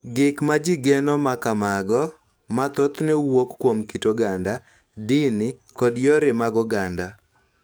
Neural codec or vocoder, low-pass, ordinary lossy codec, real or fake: codec, 44.1 kHz, 7.8 kbps, DAC; none; none; fake